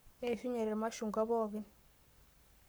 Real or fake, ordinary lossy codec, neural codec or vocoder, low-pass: fake; none; codec, 44.1 kHz, 7.8 kbps, Pupu-Codec; none